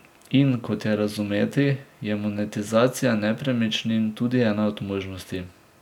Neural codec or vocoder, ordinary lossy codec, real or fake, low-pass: none; none; real; 19.8 kHz